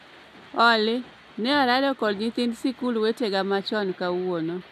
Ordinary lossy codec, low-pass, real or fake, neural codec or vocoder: none; 14.4 kHz; real; none